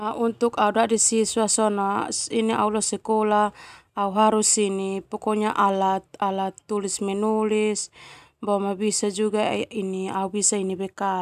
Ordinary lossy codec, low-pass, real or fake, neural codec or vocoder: none; 14.4 kHz; real; none